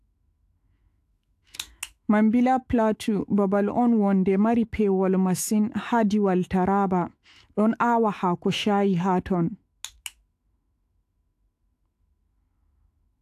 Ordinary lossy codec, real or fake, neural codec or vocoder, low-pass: AAC, 64 kbps; fake; autoencoder, 48 kHz, 128 numbers a frame, DAC-VAE, trained on Japanese speech; 14.4 kHz